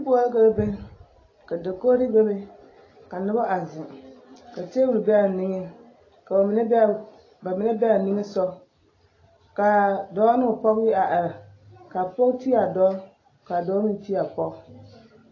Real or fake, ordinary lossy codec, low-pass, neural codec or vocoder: real; AAC, 32 kbps; 7.2 kHz; none